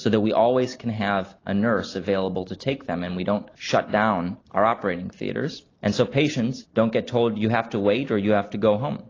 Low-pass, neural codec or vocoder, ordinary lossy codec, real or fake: 7.2 kHz; none; AAC, 32 kbps; real